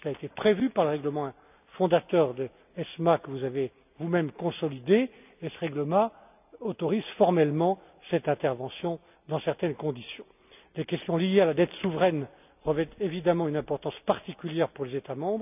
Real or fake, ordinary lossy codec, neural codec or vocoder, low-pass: real; none; none; 3.6 kHz